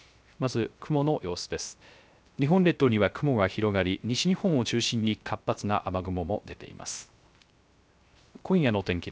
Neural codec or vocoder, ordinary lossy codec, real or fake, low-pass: codec, 16 kHz, 0.3 kbps, FocalCodec; none; fake; none